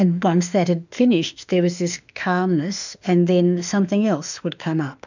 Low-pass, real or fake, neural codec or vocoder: 7.2 kHz; fake; autoencoder, 48 kHz, 32 numbers a frame, DAC-VAE, trained on Japanese speech